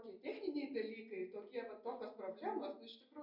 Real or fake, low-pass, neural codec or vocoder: real; 5.4 kHz; none